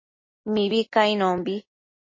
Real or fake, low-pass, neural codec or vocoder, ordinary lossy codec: real; 7.2 kHz; none; MP3, 32 kbps